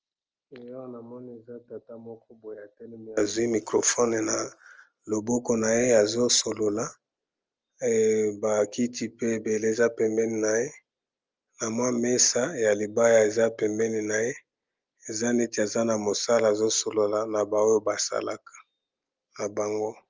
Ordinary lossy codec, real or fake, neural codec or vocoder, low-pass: Opus, 24 kbps; real; none; 7.2 kHz